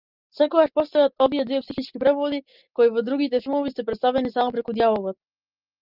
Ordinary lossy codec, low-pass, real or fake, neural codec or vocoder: Opus, 24 kbps; 5.4 kHz; real; none